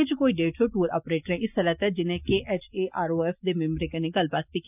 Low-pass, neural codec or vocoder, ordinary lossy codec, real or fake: 3.6 kHz; none; none; real